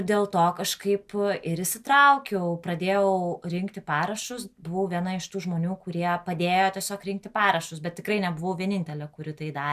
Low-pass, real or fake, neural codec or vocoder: 14.4 kHz; real; none